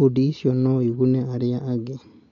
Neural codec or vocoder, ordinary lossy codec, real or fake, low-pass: none; MP3, 64 kbps; real; 7.2 kHz